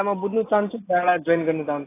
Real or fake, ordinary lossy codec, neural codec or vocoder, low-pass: real; AAC, 16 kbps; none; 3.6 kHz